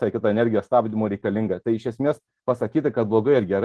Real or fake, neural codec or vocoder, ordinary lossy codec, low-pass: real; none; Opus, 16 kbps; 10.8 kHz